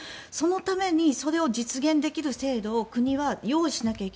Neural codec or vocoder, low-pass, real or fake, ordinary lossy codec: none; none; real; none